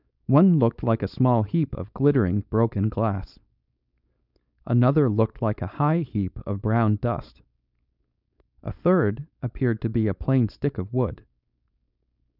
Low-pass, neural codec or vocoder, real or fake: 5.4 kHz; codec, 16 kHz, 4.8 kbps, FACodec; fake